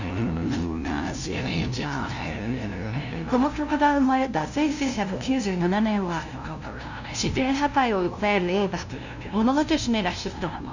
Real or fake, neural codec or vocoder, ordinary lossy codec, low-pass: fake; codec, 16 kHz, 0.5 kbps, FunCodec, trained on LibriTTS, 25 frames a second; none; 7.2 kHz